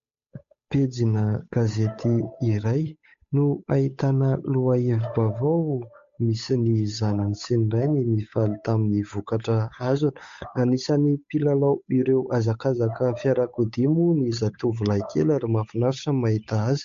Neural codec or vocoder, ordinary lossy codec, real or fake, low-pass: codec, 16 kHz, 8 kbps, FunCodec, trained on Chinese and English, 25 frames a second; MP3, 48 kbps; fake; 7.2 kHz